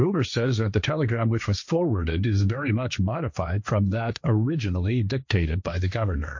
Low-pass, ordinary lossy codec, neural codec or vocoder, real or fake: 7.2 kHz; MP3, 48 kbps; codec, 16 kHz, 1.1 kbps, Voila-Tokenizer; fake